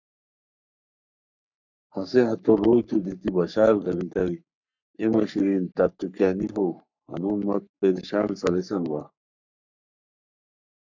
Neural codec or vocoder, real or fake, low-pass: codec, 44.1 kHz, 3.4 kbps, Pupu-Codec; fake; 7.2 kHz